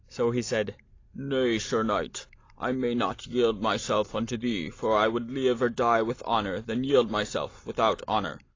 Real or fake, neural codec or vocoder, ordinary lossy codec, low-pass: real; none; AAC, 32 kbps; 7.2 kHz